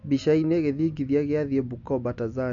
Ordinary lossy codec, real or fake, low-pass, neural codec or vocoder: none; real; 7.2 kHz; none